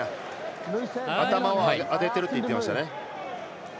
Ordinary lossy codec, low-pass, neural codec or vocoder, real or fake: none; none; none; real